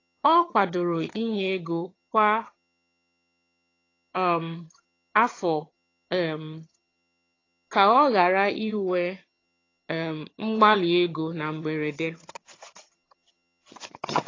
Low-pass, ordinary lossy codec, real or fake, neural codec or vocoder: 7.2 kHz; AAC, 32 kbps; fake; vocoder, 22.05 kHz, 80 mel bands, HiFi-GAN